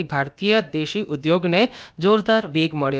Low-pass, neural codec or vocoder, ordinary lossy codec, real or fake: none; codec, 16 kHz, about 1 kbps, DyCAST, with the encoder's durations; none; fake